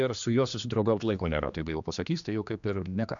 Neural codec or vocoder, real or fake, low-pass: codec, 16 kHz, 2 kbps, X-Codec, HuBERT features, trained on general audio; fake; 7.2 kHz